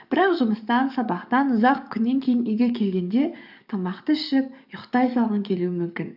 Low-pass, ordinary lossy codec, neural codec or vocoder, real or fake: 5.4 kHz; none; codec, 44.1 kHz, 7.8 kbps, DAC; fake